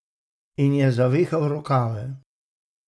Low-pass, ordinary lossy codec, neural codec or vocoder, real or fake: none; none; none; real